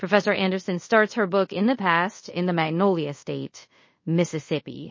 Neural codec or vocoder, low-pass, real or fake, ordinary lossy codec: codec, 24 kHz, 0.5 kbps, DualCodec; 7.2 kHz; fake; MP3, 32 kbps